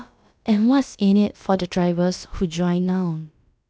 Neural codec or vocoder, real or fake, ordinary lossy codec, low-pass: codec, 16 kHz, about 1 kbps, DyCAST, with the encoder's durations; fake; none; none